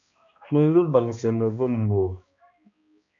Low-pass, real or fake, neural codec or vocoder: 7.2 kHz; fake; codec, 16 kHz, 1 kbps, X-Codec, HuBERT features, trained on balanced general audio